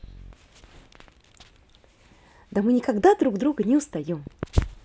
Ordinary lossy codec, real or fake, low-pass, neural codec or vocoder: none; real; none; none